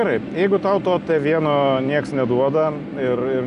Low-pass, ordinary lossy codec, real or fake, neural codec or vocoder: 10.8 kHz; AAC, 96 kbps; real; none